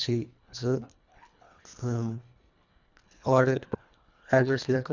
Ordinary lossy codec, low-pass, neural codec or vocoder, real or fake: none; 7.2 kHz; codec, 24 kHz, 1.5 kbps, HILCodec; fake